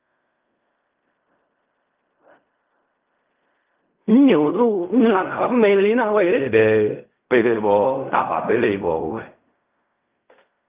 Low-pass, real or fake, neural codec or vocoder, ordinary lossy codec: 3.6 kHz; fake; codec, 16 kHz in and 24 kHz out, 0.4 kbps, LongCat-Audio-Codec, fine tuned four codebook decoder; Opus, 24 kbps